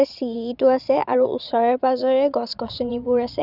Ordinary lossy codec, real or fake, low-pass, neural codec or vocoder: none; fake; 5.4 kHz; vocoder, 22.05 kHz, 80 mel bands, Vocos